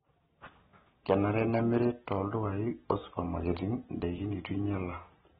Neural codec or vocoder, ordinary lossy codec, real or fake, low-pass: none; AAC, 16 kbps; real; 9.9 kHz